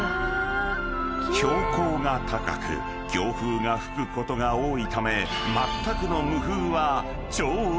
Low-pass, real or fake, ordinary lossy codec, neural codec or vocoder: none; real; none; none